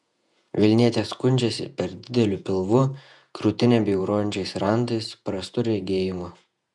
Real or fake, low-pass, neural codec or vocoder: fake; 10.8 kHz; vocoder, 48 kHz, 128 mel bands, Vocos